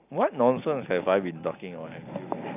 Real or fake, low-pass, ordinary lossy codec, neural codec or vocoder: real; 3.6 kHz; none; none